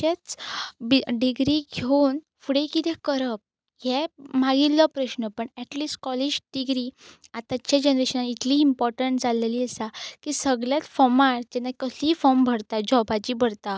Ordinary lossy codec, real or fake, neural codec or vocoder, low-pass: none; real; none; none